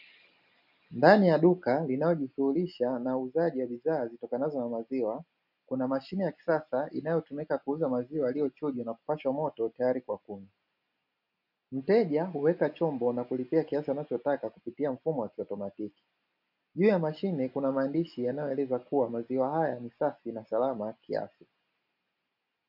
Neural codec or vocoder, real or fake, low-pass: none; real; 5.4 kHz